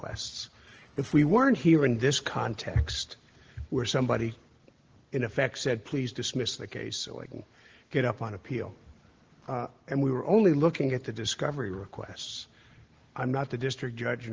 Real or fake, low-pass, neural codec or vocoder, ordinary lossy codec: real; 7.2 kHz; none; Opus, 16 kbps